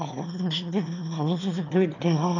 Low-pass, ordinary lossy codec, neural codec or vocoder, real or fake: 7.2 kHz; none; autoencoder, 22.05 kHz, a latent of 192 numbers a frame, VITS, trained on one speaker; fake